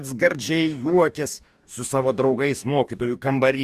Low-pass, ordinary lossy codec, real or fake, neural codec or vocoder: 14.4 kHz; Opus, 64 kbps; fake; codec, 44.1 kHz, 2.6 kbps, DAC